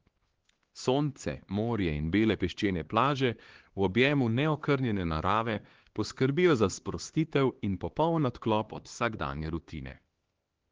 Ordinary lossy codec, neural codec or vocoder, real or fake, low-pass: Opus, 16 kbps; codec, 16 kHz, 2 kbps, X-Codec, HuBERT features, trained on LibriSpeech; fake; 7.2 kHz